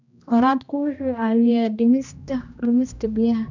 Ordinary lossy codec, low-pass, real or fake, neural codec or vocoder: none; 7.2 kHz; fake; codec, 16 kHz, 1 kbps, X-Codec, HuBERT features, trained on general audio